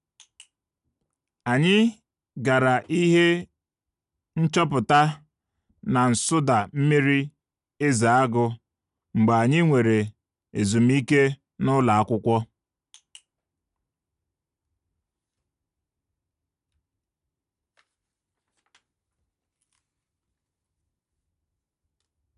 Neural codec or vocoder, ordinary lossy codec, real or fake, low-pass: none; none; real; 10.8 kHz